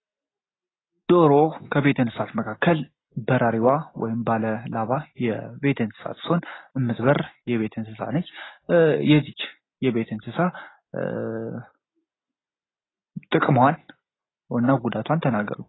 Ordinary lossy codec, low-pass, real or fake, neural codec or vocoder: AAC, 16 kbps; 7.2 kHz; real; none